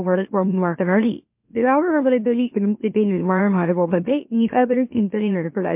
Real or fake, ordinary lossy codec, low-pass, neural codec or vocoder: fake; MP3, 32 kbps; 3.6 kHz; autoencoder, 44.1 kHz, a latent of 192 numbers a frame, MeloTTS